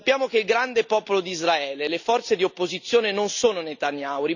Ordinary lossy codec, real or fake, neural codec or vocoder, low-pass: none; real; none; 7.2 kHz